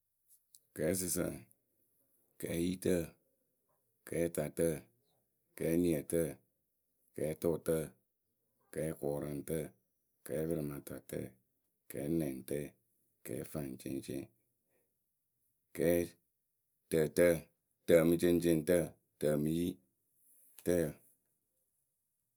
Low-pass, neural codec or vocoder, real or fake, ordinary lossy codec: none; none; real; none